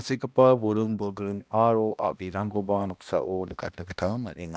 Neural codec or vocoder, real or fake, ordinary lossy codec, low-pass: codec, 16 kHz, 1 kbps, X-Codec, HuBERT features, trained on balanced general audio; fake; none; none